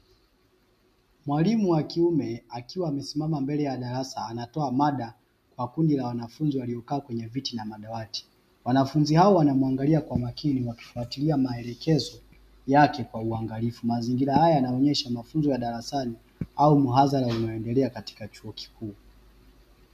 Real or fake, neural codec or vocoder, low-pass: real; none; 14.4 kHz